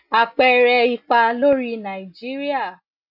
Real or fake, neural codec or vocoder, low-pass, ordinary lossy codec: real; none; 5.4 kHz; none